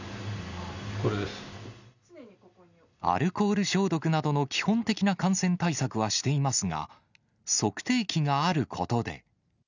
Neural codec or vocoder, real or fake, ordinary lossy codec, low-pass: none; real; none; 7.2 kHz